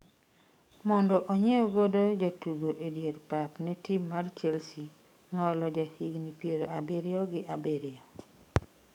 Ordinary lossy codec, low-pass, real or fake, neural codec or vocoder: none; 19.8 kHz; fake; codec, 44.1 kHz, 7.8 kbps, DAC